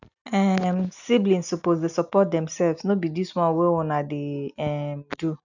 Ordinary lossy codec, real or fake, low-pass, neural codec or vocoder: none; real; 7.2 kHz; none